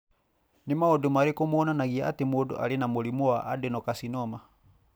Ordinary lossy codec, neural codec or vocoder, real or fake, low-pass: none; none; real; none